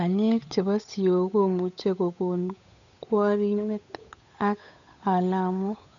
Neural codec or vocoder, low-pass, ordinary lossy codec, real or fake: codec, 16 kHz, 8 kbps, FunCodec, trained on Chinese and English, 25 frames a second; 7.2 kHz; none; fake